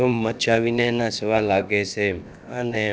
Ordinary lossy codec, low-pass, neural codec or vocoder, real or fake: none; none; codec, 16 kHz, about 1 kbps, DyCAST, with the encoder's durations; fake